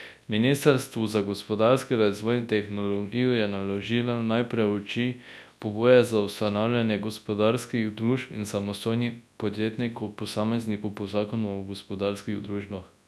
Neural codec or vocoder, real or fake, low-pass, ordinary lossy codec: codec, 24 kHz, 0.9 kbps, WavTokenizer, large speech release; fake; none; none